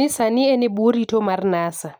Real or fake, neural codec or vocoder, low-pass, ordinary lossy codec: real; none; none; none